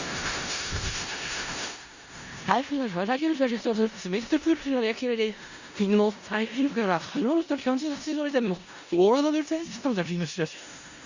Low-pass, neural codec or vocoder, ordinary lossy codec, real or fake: 7.2 kHz; codec, 16 kHz in and 24 kHz out, 0.4 kbps, LongCat-Audio-Codec, four codebook decoder; Opus, 64 kbps; fake